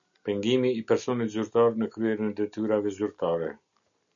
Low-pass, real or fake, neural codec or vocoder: 7.2 kHz; real; none